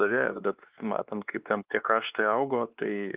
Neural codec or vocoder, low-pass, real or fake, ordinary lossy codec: codec, 16 kHz, 2 kbps, X-Codec, WavLM features, trained on Multilingual LibriSpeech; 3.6 kHz; fake; Opus, 32 kbps